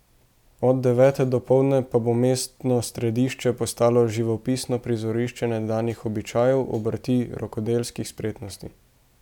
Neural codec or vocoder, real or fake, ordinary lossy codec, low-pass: none; real; none; 19.8 kHz